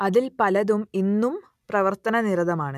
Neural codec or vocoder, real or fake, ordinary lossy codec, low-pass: none; real; none; 14.4 kHz